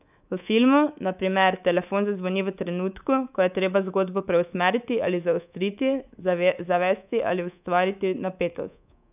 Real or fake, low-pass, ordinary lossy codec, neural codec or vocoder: fake; 3.6 kHz; none; codec, 24 kHz, 3.1 kbps, DualCodec